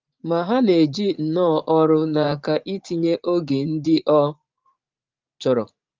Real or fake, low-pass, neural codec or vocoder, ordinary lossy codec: fake; 7.2 kHz; vocoder, 44.1 kHz, 80 mel bands, Vocos; Opus, 32 kbps